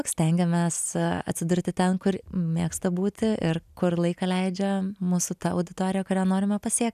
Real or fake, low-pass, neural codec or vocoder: real; 14.4 kHz; none